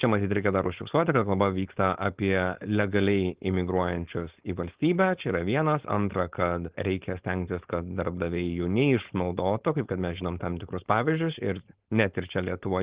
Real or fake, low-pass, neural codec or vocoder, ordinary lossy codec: fake; 3.6 kHz; codec, 16 kHz, 4.8 kbps, FACodec; Opus, 16 kbps